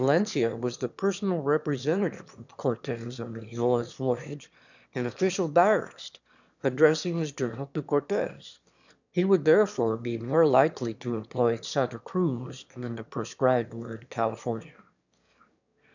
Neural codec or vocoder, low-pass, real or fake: autoencoder, 22.05 kHz, a latent of 192 numbers a frame, VITS, trained on one speaker; 7.2 kHz; fake